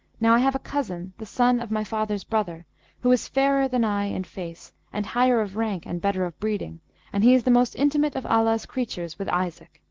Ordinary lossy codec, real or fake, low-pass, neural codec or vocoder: Opus, 16 kbps; real; 7.2 kHz; none